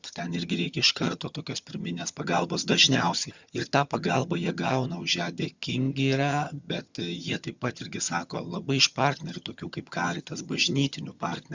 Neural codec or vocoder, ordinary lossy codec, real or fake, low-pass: vocoder, 22.05 kHz, 80 mel bands, HiFi-GAN; Opus, 64 kbps; fake; 7.2 kHz